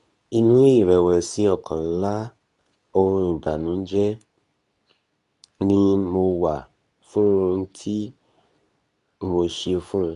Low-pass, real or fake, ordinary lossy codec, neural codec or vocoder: 10.8 kHz; fake; none; codec, 24 kHz, 0.9 kbps, WavTokenizer, medium speech release version 2